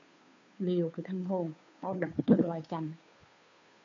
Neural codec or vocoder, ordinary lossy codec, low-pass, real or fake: codec, 16 kHz, 2 kbps, FunCodec, trained on Chinese and English, 25 frames a second; MP3, 64 kbps; 7.2 kHz; fake